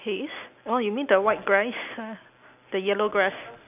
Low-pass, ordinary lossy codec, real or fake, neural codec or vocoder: 3.6 kHz; none; real; none